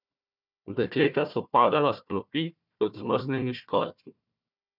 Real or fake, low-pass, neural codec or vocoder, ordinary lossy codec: fake; 5.4 kHz; codec, 16 kHz, 1 kbps, FunCodec, trained on Chinese and English, 50 frames a second; none